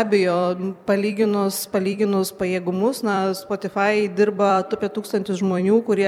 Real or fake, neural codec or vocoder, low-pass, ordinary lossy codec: fake; vocoder, 44.1 kHz, 128 mel bands every 256 samples, BigVGAN v2; 19.8 kHz; MP3, 96 kbps